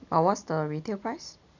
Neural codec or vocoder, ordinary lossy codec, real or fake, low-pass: none; none; real; 7.2 kHz